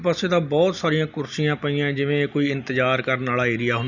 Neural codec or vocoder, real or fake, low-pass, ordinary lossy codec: none; real; 7.2 kHz; none